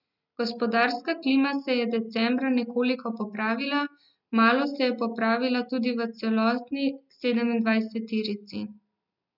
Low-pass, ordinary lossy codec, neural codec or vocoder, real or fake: 5.4 kHz; none; none; real